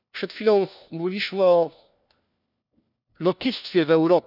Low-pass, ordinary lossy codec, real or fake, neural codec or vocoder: 5.4 kHz; none; fake; codec, 16 kHz, 1 kbps, FunCodec, trained on LibriTTS, 50 frames a second